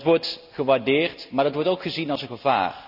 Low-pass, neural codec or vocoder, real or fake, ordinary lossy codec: 5.4 kHz; none; real; none